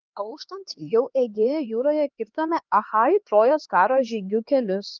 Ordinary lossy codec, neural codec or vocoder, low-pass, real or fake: Opus, 32 kbps; codec, 16 kHz, 2 kbps, X-Codec, HuBERT features, trained on LibriSpeech; 7.2 kHz; fake